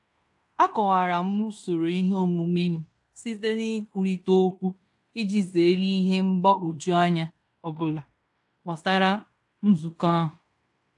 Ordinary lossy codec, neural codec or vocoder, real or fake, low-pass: AAC, 64 kbps; codec, 16 kHz in and 24 kHz out, 0.9 kbps, LongCat-Audio-Codec, fine tuned four codebook decoder; fake; 10.8 kHz